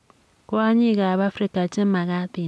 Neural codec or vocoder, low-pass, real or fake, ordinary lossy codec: none; none; real; none